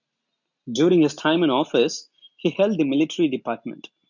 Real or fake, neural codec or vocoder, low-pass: real; none; 7.2 kHz